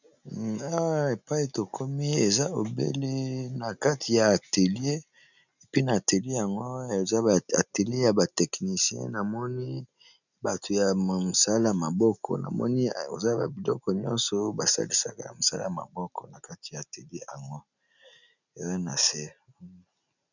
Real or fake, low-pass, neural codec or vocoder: real; 7.2 kHz; none